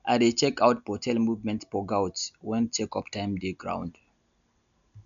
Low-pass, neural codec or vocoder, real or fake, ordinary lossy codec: 7.2 kHz; none; real; none